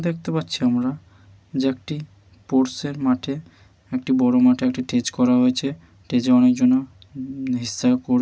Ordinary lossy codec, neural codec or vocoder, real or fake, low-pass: none; none; real; none